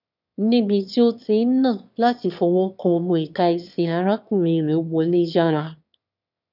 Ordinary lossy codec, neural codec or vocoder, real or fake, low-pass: none; autoencoder, 22.05 kHz, a latent of 192 numbers a frame, VITS, trained on one speaker; fake; 5.4 kHz